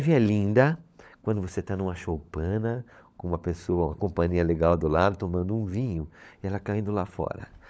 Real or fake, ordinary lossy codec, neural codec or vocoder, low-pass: fake; none; codec, 16 kHz, 16 kbps, FunCodec, trained on LibriTTS, 50 frames a second; none